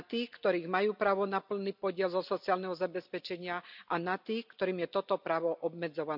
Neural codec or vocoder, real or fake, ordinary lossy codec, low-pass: none; real; none; 5.4 kHz